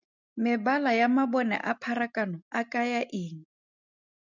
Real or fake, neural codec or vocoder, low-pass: real; none; 7.2 kHz